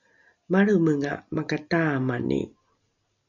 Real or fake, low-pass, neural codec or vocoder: real; 7.2 kHz; none